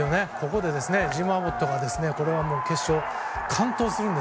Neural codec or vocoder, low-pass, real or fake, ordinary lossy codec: none; none; real; none